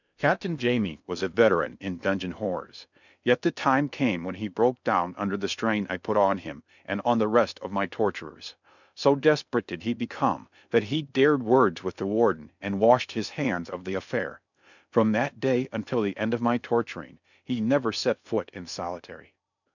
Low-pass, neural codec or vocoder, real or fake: 7.2 kHz; codec, 16 kHz in and 24 kHz out, 0.6 kbps, FocalCodec, streaming, 4096 codes; fake